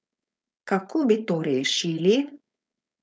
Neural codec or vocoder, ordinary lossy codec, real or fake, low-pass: codec, 16 kHz, 4.8 kbps, FACodec; none; fake; none